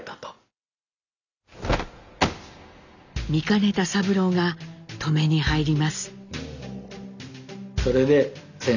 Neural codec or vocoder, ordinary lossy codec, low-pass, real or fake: none; none; 7.2 kHz; real